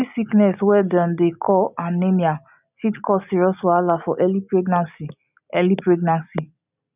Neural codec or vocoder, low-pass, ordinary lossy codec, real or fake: none; 3.6 kHz; none; real